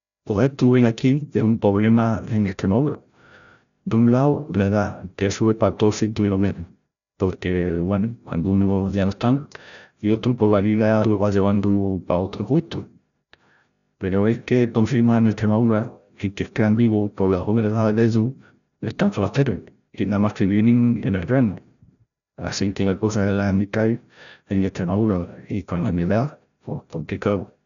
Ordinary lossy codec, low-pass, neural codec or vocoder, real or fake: none; 7.2 kHz; codec, 16 kHz, 0.5 kbps, FreqCodec, larger model; fake